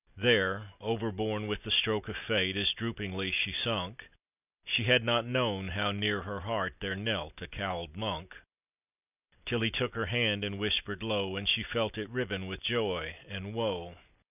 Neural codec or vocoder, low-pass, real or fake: none; 3.6 kHz; real